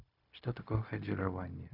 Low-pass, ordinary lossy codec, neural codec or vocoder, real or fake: 5.4 kHz; none; codec, 16 kHz, 0.4 kbps, LongCat-Audio-Codec; fake